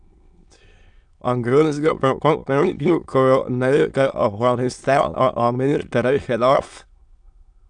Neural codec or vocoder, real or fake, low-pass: autoencoder, 22.05 kHz, a latent of 192 numbers a frame, VITS, trained on many speakers; fake; 9.9 kHz